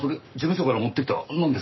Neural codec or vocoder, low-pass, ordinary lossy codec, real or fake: codec, 44.1 kHz, 7.8 kbps, Pupu-Codec; 7.2 kHz; MP3, 24 kbps; fake